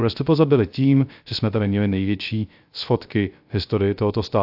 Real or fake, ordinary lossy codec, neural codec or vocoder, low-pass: fake; AAC, 48 kbps; codec, 16 kHz, 0.3 kbps, FocalCodec; 5.4 kHz